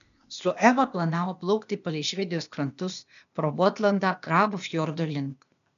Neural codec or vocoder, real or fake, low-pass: codec, 16 kHz, 0.8 kbps, ZipCodec; fake; 7.2 kHz